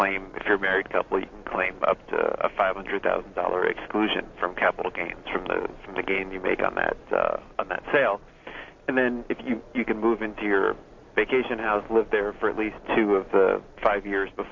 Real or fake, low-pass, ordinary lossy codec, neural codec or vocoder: real; 7.2 kHz; MP3, 48 kbps; none